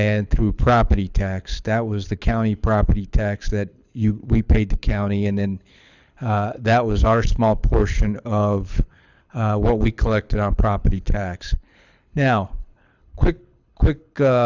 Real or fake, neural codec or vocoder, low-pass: fake; codec, 24 kHz, 6 kbps, HILCodec; 7.2 kHz